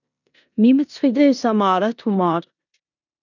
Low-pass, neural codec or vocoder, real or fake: 7.2 kHz; codec, 16 kHz in and 24 kHz out, 0.9 kbps, LongCat-Audio-Codec, four codebook decoder; fake